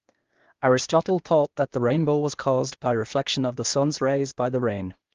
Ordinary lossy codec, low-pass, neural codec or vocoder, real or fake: Opus, 32 kbps; 7.2 kHz; codec, 16 kHz, 0.8 kbps, ZipCodec; fake